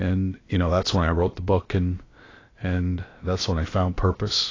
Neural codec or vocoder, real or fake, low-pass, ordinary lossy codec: codec, 16 kHz, about 1 kbps, DyCAST, with the encoder's durations; fake; 7.2 kHz; AAC, 32 kbps